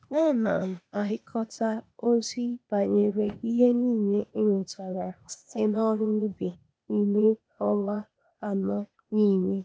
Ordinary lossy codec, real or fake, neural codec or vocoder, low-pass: none; fake; codec, 16 kHz, 0.8 kbps, ZipCodec; none